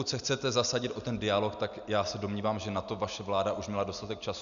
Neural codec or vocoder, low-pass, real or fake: none; 7.2 kHz; real